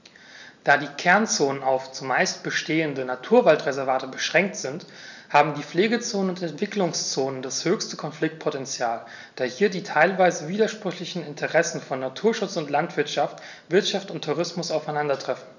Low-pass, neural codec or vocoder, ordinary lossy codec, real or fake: 7.2 kHz; none; none; real